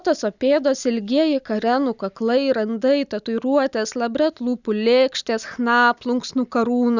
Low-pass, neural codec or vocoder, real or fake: 7.2 kHz; none; real